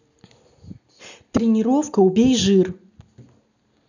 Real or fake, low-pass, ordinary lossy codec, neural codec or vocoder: real; 7.2 kHz; none; none